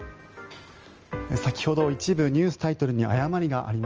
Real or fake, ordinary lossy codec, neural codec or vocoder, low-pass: real; Opus, 24 kbps; none; 7.2 kHz